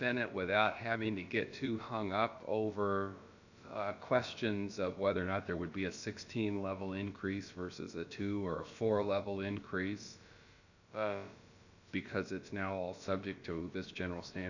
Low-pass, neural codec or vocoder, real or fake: 7.2 kHz; codec, 16 kHz, about 1 kbps, DyCAST, with the encoder's durations; fake